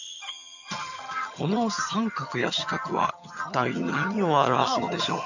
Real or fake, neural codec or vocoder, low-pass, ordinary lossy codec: fake; vocoder, 22.05 kHz, 80 mel bands, HiFi-GAN; 7.2 kHz; none